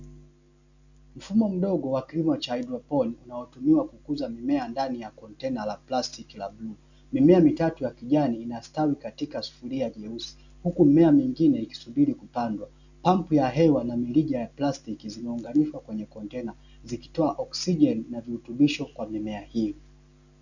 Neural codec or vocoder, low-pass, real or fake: none; 7.2 kHz; real